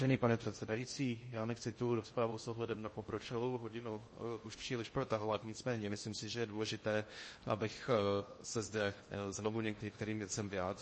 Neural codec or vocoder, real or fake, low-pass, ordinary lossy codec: codec, 16 kHz in and 24 kHz out, 0.6 kbps, FocalCodec, streaming, 2048 codes; fake; 10.8 kHz; MP3, 32 kbps